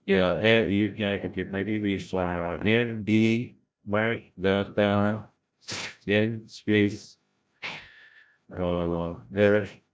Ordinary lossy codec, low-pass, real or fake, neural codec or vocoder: none; none; fake; codec, 16 kHz, 0.5 kbps, FreqCodec, larger model